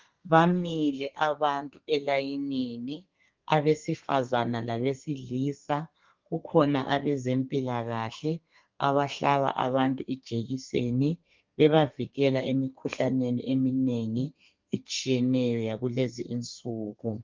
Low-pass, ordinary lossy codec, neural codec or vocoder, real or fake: 7.2 kHz; Opus, 32 kbps; codec, 32 kHz, 1.9 kbps, SNAC; fake